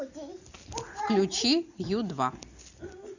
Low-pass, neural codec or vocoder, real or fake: 7.2 kHz; none; real